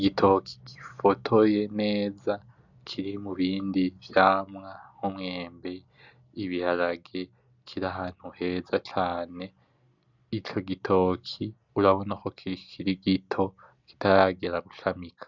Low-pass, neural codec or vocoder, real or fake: 7.2 kHz; none; real